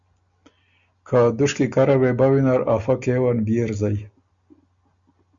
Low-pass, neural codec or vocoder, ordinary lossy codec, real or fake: 7.2 kHz; none; Opus, 64 kbps; real